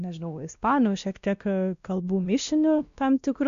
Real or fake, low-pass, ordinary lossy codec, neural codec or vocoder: fake; 7.2 kHz; Opus, 64 kbps; codec, 16 kHz, 1 kbps, X-Codec, WavLM features, trained on Multilingual LibriSpeech